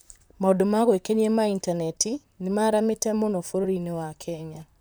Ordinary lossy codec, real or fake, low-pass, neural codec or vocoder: none; fake; none; vocoder, 44.1 kHz, 128 mel bands, Pupu-Vocoder